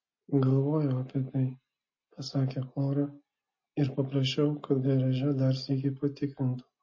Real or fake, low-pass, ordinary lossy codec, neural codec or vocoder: real; 7.2 kHz; MP3, 32 kbps; none